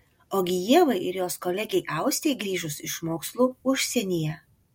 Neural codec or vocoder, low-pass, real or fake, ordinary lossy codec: none; 19.8 kHz; real; MP3, 64 kbps